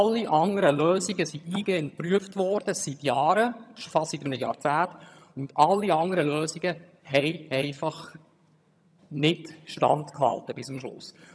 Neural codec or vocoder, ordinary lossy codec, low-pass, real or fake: vocoder, 22.05 kHz, 80 mel bands, HiFi-GAN; none; none; fake